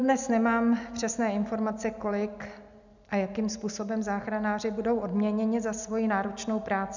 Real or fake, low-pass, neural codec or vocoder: real; 7.2 kHz; none